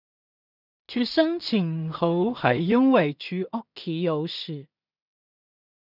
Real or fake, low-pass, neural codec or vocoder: fake; 5.4 kHz; codec, 16 kHz in and 24 kHz out, 0.4 kbps, LongCat-Audio-Codec, two codebook decoder